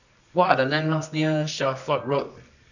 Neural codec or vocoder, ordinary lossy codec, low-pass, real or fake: codec, 24 kHz, 0.9 kbps, WavTokenizer, medium music audio release; none; 7.2 kHz; fake